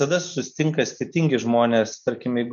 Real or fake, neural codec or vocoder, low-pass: real; none; 7.2 kHz